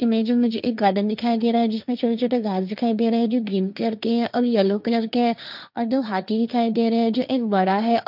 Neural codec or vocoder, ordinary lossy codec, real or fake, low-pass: codec, 16 kHz, 1.1 kbps, Voila-Tokenizer; none; fake; 5.4 kHz